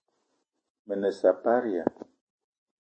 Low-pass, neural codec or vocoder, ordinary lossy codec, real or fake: 9.9 kHz; none; MP3, 32 kbps; real